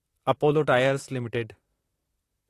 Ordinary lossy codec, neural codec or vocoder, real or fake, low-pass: AAC, 48 kbps; vocoder, 44.1 kHz, 128 mel bands, Pupu-Vocoder; fake; 14.4 kHz